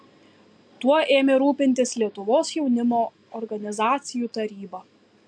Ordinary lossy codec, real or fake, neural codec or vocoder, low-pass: MP3, 64 kbps; real; none; 9.9 kHz